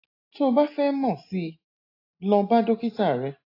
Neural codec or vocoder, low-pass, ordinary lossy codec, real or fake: none; 5.4 kHz; AAC, 32 kbps; real